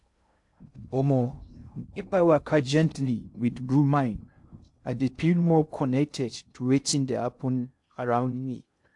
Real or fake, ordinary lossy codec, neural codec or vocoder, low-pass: fake; AAC, 64 kbps; codec, 16 kHz in and 24 kHz out, 0.6 kbps, FocalCodec, streaming, 2048 codes; 10.8 kHz